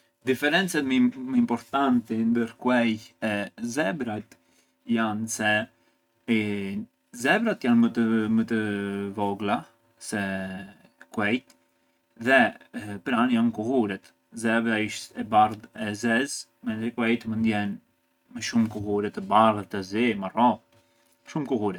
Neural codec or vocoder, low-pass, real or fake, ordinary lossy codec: none; 19.8 kHz; real; none